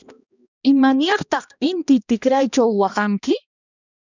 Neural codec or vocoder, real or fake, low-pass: codec, 16 kHz, 1 kbps, X-Codec, HuBERT features, trained on balanced general audio; fake; 7.2 kHz